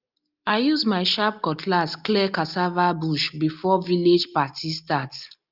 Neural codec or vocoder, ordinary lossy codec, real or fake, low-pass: none; Opus, 24 kbps; real; 5.4 kHz